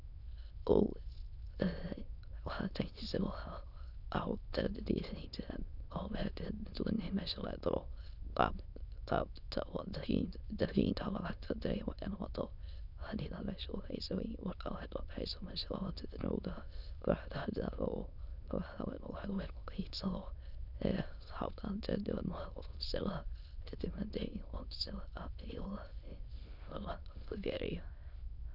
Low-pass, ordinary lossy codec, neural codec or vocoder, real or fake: 5.4 kHz; none; autoencoder, 22.05 kHz, a latent of 192 numbers a frame, VITS, trained on many speakers; fake